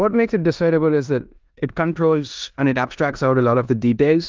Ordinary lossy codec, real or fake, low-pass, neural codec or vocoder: Opus, 24 kbps; fake; 7.2 kHz; codec, 16 kHz in and 24 kHz out, 0.9 kbps, LongCat-Audio-Codec, four codebook decoder